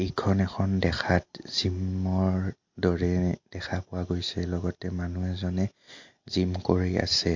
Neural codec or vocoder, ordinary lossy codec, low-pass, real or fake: none; MP3, 48 kbps; 7.2 kHz; real